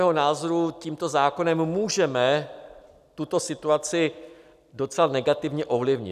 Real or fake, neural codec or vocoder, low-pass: real; none; 14.4 kHz